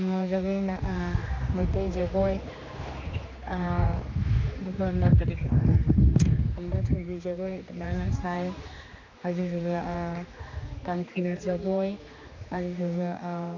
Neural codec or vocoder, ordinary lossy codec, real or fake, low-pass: codec, 16 kHz, 2 kbps, X-Codec, HuBERT features, trained on general audio; AAC, 48 kbps; fake; 7.2 kHz